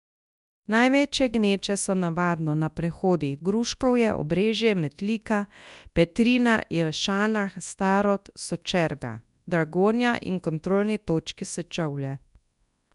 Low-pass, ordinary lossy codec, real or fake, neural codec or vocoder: 10.8 kHz; none; fake; codec, 24 kHz, 0.9 kbps, WavTokenizer, large speech release